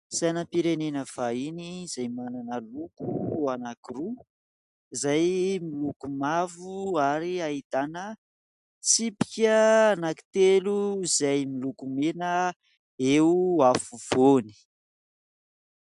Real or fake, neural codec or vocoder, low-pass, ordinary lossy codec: real; none; 10.8 kHz; MP3, 96 kbps